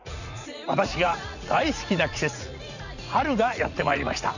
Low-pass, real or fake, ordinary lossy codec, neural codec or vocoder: 7.2 kHz; fake; none; vocoder, 44.1 kHz, 80 mel bands, Vocos